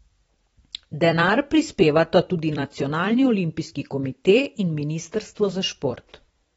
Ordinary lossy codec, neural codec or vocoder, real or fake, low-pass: AAC, 24 kbps; vocoder, 44.1 kHz, 128 mel bands every 256 samples, BigVGAN v2; fake; 19.8 kHz